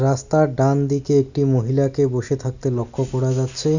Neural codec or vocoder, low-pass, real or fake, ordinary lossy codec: none; 7.2 kHz; real; none